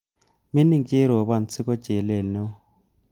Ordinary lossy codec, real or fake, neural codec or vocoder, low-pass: Opus, 24 kbps; real; none; 19.8 kHz